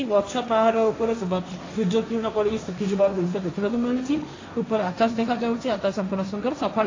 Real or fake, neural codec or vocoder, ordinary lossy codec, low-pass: fake; codec, 16 kHz, 1.1 kbps, Voila-Tokenizer; AAC, 32 kbps; 7.2 kHz